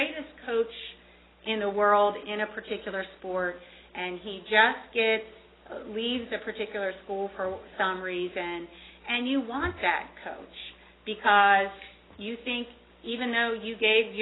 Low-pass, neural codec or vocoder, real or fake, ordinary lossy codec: 7.2 kHz; none; real; AAC, 16 kbps